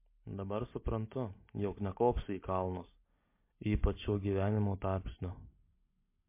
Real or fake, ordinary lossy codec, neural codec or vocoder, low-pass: real; MP3, 24 kbps; none; 3.6 kHz